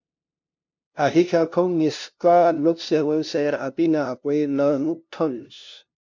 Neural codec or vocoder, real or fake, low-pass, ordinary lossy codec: codec, 16 kHz, 0.5 kbps, FunCodec, trained on LibriTTS, 25 frames a second; fake; 7.2 kHz; MP3, 48 kbps